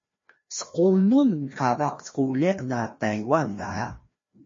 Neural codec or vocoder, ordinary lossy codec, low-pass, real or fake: codec, 16 kHz, 1 kbps, FreqCodec, larger model; MP3, 32 kbps; 7.2 kHz; fake